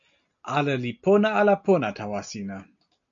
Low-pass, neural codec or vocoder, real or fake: 7.2 kHz; none; real